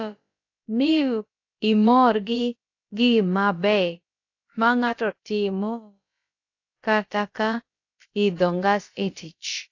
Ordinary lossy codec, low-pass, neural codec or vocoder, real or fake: AAC, 48 kbps; 7.2 kHz; codec, 16 kHz, about 1 kbps, DyCAST, with the encoder's durations; fake